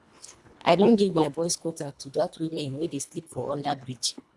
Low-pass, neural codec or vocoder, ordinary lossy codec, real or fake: none; codec, 24 kHz, 1.5 kbps, HILCodec; none; fake